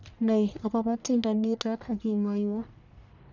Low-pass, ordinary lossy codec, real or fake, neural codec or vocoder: 7.2 kHz; none; fake; codec, 44.1 kHz, 1.7 kbps, Pupu-Codec